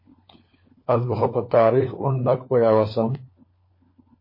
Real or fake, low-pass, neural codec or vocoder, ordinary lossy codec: fake; 5.4 kHz; codec, 16 kHz, 4 kbps, FunCodec, trained on LibriTTS, 50 frames a second; MP3, 24 kbps